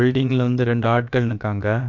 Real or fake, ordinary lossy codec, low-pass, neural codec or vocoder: fake; none; 7.2 kHz; codec, 16 kHz, about 1 kbps, DyCAST, with the encoder's durations